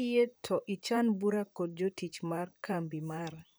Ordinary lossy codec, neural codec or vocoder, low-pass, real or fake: none; vocoder, 44.1 kHz, 128 mel bands, Pupu-Vocoder; none; fake